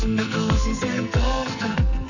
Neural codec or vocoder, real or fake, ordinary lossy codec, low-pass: codec, 44.1 kHz, 2.6 kbps, SNAC; fake; none; 7.2 kHz